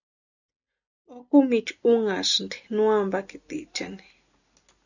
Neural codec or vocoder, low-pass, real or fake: none; 7.2 kHz; real